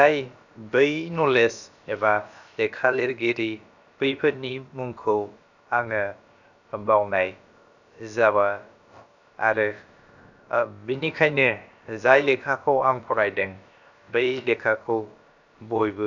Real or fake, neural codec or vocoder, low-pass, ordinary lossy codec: fake; codec, 16 kHz, about 1 kbps, DyCAST, with the encoder's durations; 7.2 kHz; none